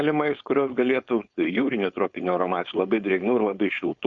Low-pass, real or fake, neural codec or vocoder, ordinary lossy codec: 7.2 kHz; fake; codec, 16 kHz, 4.8 kbps, FACodec; Opus, 64 kbps